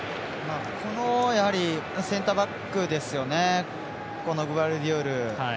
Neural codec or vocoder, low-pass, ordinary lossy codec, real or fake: none; none; none; real